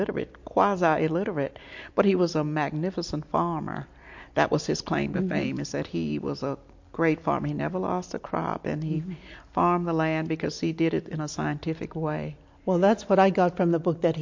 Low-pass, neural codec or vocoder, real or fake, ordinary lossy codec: 7.2 kHz; none; real; MP3, 48 kbps